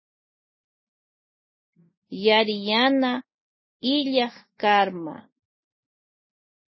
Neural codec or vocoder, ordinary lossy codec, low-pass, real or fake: none; MP3, 24 kbps; 7.2 kHz; real